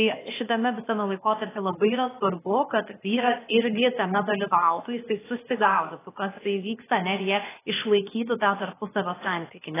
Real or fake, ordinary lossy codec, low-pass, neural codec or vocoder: fake; AAC, 16 kbps; 3.6 kHz; codec, 16 kHz, 0.8 kbps, ZipCodec